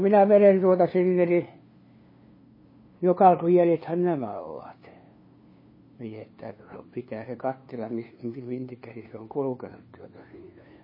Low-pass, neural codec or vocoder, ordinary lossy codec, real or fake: 5.4 kHz; codec, 16 kHz, 2 kbps, FunCodec, trained on LibriTTS, 25 frames a second; MP3, 24 kbps; fake